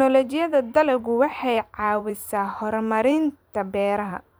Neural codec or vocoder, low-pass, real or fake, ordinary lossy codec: vocoder, 44.1 kHz, 128 mel bands every 512 samples, BigVGAN v2; none; fake; none